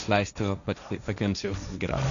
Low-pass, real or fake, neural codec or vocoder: 7.2 kHz; fake; codec, 16 kHz, 1.1 kbps, Voila-Tokenizer